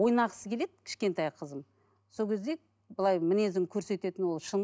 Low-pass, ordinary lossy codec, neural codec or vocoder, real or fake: none; none; none; real